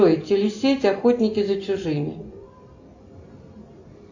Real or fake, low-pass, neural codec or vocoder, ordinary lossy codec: real; 7.2 kHz; none; AAC, 48 kbps